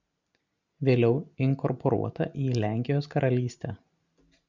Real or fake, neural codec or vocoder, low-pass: real; none; 7.2 kHz